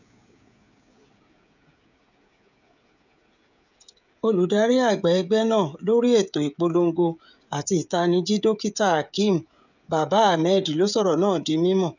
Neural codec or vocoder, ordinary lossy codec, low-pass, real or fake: codec, 16 kHz, 16 kbps, FreqCodec, smaller model; none; 7.2 kHz; fake